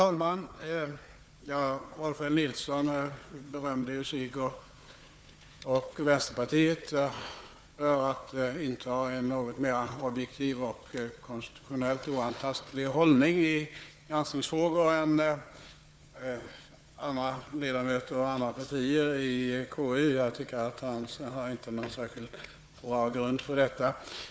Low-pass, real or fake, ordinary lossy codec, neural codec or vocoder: none; fake; none; codec, 16 kHz, 4 kbps, FunCodec, trained on Chinese and English, 50 frames a second